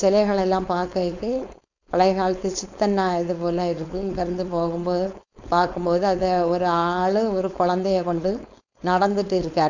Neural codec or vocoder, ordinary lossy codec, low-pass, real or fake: codec, 16 kHz, 4.8 kbps, FACodec; none; 7.2 kHz; fake